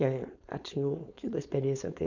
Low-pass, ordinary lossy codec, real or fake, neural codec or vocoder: 7.2 kHz; none; fake; codec, 16 kHz, 4.8 kbps, FACodec